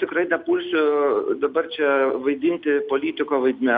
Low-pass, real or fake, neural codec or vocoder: 7.2 kHz; real; none